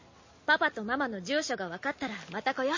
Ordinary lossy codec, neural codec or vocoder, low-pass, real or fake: MP3, 32 kbps; none; 7.2 kHz; real